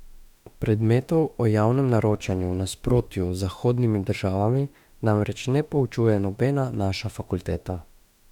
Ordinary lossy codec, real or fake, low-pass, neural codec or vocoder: none; fake; 19.8 kHz; autoencoder, 48 kHz, 32 numbers a frame, DAC-VAE, trained on Japanese speech